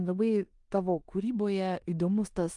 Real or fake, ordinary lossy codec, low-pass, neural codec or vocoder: fake; Opus, 32 kbps; 10.8 kHz; codec, 16 kHz in and 24 kHz out, 0.9 kbps, LongCat-Audio-Codec, four codebook decoder